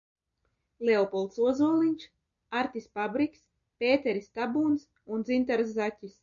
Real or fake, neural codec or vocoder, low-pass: real; none; 7.2 kHz